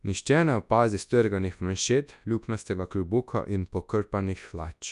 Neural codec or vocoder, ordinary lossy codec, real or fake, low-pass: codec, 24 kHz, 0.9 kbps, WavTokenizer, large speech release; none; fake; 10.8 kHz